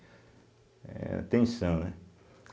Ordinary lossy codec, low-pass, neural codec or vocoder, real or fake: none; none; none; real